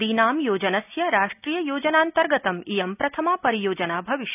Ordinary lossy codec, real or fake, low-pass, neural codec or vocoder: none; real; 3.6 kHz; none